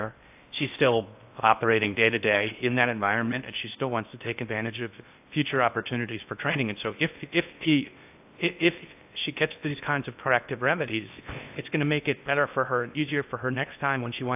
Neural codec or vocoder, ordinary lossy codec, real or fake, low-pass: codec, 16 kHz in and 24 kHz out, 0.6 kbps, FocalCodec, streaming, 4096 codes; AAC, 32 kbps; fake; 3.6 kHz